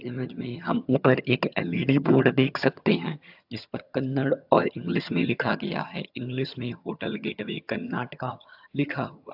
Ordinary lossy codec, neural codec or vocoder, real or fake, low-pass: AAC, 48 kbps; vocoder, 22.05 kHz, 80 mel bands, HiFi-GAN; fake; 5.4 kHz